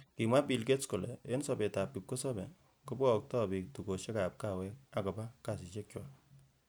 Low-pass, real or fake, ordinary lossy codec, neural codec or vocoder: none; real; none; none